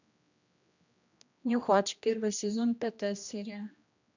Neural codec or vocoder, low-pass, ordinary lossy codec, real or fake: codec, 16 kHz, 1 kbps, X-Codec, HuBERT features, trained on general audio; 7.2 kHz; none; fake